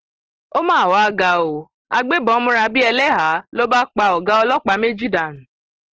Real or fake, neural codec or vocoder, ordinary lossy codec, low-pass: real; none; Opus, 16 kbps; 7.2 kHz